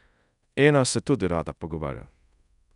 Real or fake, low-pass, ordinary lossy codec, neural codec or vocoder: fake; 10.8 kHz; none; codec, 24 kHz, 0.5 kbps, DualCodec